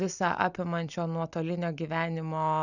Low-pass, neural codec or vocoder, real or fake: 7.2 kHz; none; real